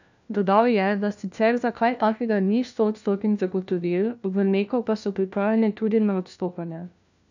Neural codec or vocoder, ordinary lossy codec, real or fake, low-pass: codec, 16 kHz, 1 kbps, FunCodec, trained on LibriTTS, 50 frames a second; none; fake; 7.2 kHz